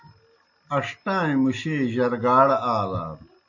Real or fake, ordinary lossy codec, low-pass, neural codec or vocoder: real; AAC, 48 kbps; 7.2 kHz; none